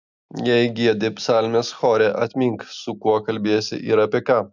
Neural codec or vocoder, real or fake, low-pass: none; real; 7.2 kHz